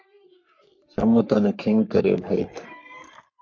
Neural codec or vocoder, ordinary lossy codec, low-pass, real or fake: codec, 44.1 kHz, 3.4 kbps, Pupu-Codec; MP3, 48 kbps; 7.2 kHz; fake